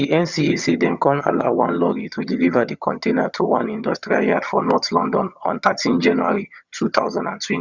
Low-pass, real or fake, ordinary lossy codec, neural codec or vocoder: 7.2 kHz; fake; Opus, 64 kbps; vocoder, 22.05 kHz, 80 mel bands, HiFi-GAN